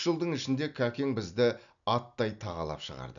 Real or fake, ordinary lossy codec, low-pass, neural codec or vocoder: real; none; 7.2 kHz; none